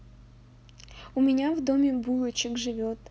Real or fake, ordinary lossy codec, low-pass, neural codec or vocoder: real; none; none; none